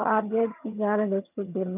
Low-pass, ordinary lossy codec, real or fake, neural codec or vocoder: 3.6 kHz; none; fake; vocoder, 22.05 kHz, 80 mel bands, HiFi-GAN